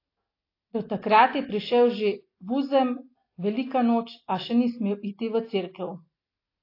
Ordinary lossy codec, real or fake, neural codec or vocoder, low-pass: AAC, 32 kbps; real; none; 5.4 kHz